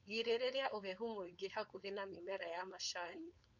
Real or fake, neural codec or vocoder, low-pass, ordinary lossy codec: fake; codec, 16 kHz, 4.8 kbps, FACodec; 7.2 kHz; none